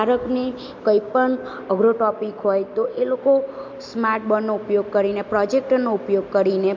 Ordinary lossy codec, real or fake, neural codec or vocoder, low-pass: MP3, 48 kbps; real; none; 7.2 kHz